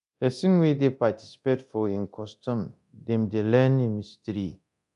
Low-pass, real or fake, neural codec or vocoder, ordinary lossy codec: 10.8 kHz; fake; codec, 24 kHz, 0.9 kbps, DualCodec; none